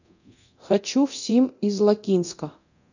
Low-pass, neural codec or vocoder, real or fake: 7.2 kHz; codec, 24 kHz, 0.9 kbps, DualCodec; fake